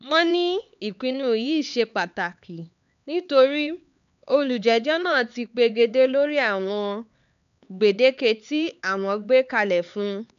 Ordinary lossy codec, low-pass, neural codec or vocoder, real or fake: none; 7.2 kHz; codec, 16 kHz, 4 kbps, X-Codec, HuBERT features, trained on LibriSpeech; fake